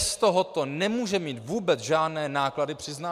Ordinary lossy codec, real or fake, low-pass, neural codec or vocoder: MP3, 96 kbps; real; 14.4 kHz; none